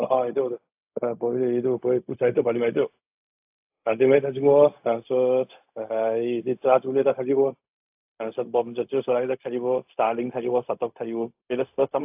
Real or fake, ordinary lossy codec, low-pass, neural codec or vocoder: fake; AAC, 32 kbps; 3.6 kHz; codec, 16 kHz, 0.4 kbps, LongCat-Audio-Codec